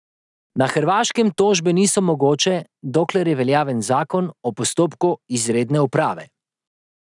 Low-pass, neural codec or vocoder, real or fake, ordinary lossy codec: 10.8 kHz; none; real; none